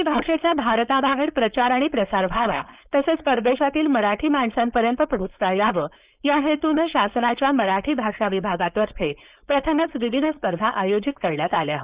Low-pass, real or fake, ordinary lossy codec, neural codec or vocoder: 3.6 kHz; fake; Opus, 64 kbps; codec, 16 kHz, 4.8 kbps, FACodec